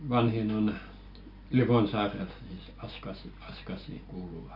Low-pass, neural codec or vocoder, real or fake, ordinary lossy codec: 5.4 kHz; none; real; none